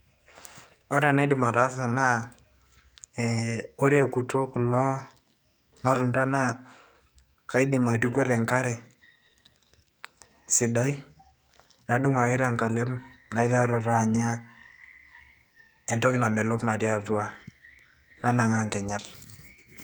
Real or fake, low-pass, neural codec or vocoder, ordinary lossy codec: fake; none; codec, 44.1 kHz, 2.6 kbps, SNAC; none